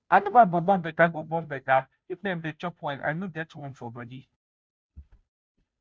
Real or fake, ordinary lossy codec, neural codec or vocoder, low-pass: fake; none; codec, 16 kHz, 0.5 kbps, FunCodec, trained on Chinese and English, 25 frames a second; none